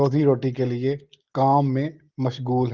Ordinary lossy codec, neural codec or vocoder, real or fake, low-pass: Opus, 16 kbps; none; real; 7.2 kHz